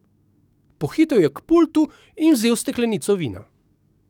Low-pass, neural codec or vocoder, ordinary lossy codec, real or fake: 19.8 kHz; codec, 44.1 kHz, 7.8 kbps, DAC; none; fake